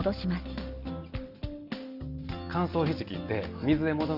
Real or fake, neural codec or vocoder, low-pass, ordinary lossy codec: real; none; 5.4 kHz; Opus, 32 kbps